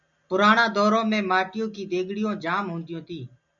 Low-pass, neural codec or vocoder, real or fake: 7.2 kHz; none; real